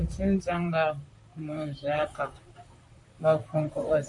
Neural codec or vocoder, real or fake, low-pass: vocoder, 44.1 kHz, 128 mel bands, Pupu-Vocoder; fake; 10.8 kHz